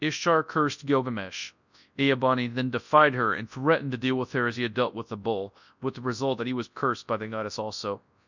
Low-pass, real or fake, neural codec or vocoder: 7.2 kHz; fake; codec, 24 kHz, 0.9 kbps, WavTokenizer, large speech release